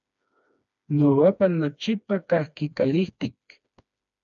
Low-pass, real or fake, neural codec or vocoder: 7.2 kHz; fake; codec, 16 kHz, 2 kbps, FreqCodec, smaller model